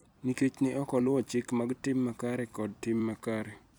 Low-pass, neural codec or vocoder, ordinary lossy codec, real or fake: none; vocoder, 44.1 kHz, 128 mel bands every 256 samples, BigVGAN v2; none; fake